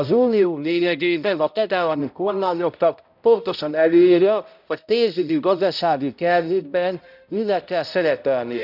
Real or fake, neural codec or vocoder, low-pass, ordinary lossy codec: fake; codec, 16 kHz, 0.5 kbps, X-Codec, HuBERT features, trained on balanced general audio; 5.4 kHz; none